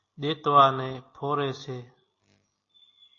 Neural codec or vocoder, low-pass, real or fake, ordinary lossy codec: none; 7.2 kHz; real; AAC, 32 kbps